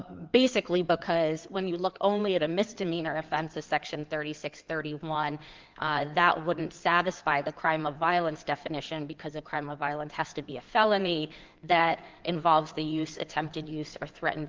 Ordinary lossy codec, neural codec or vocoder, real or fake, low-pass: Opus, 24 kbps; codec, 16 kHz in and 24 kHz out, 2.2 kbps, FireRedTTS-2 codec; fake; 7.2 kHz